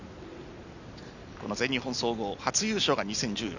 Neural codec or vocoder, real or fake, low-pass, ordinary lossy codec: none; real; 7.2 kHz; none